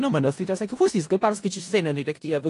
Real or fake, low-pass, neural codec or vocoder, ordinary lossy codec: fake; 10.8 kHz; codec, 16 kHz in and 24 kHz out, 0.4 kbps, LongCat-Audio-Codec, four codebook decoder; AAC, 48 kbps